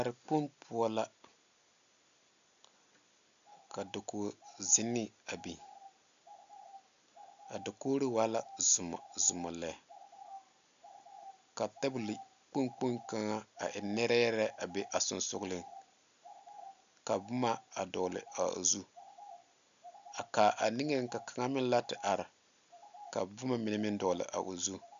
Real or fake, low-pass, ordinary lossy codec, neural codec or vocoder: real; 7.2 kHz; MP3, 96 kbps; none